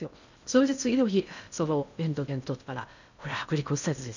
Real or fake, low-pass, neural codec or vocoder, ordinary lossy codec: fake; 7.2 kHz; codec, 16 kHz in and 24 kHz out, 0.6 kbps, FocalCodec, streaming, 2048 codes; none